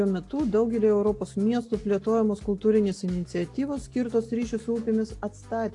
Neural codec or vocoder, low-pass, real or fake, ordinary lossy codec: none; 10.8 kHz; real; AAC, 48 kbps